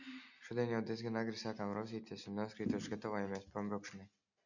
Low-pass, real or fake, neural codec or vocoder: 7.2 kHz; real; none